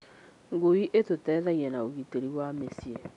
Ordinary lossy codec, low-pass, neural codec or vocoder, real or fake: none; 10.8 kHz; none; real